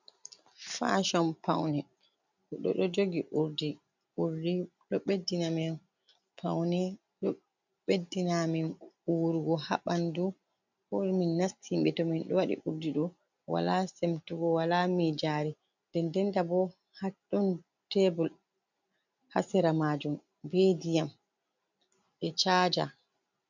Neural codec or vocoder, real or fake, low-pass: none; real; 7.2 kHz